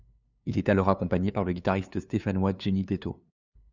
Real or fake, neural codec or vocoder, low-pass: fake; codec, 16 kHz, 2 kbps, FunCodec, trained on LibriTTS, 25 frames a second; 7.2 kHz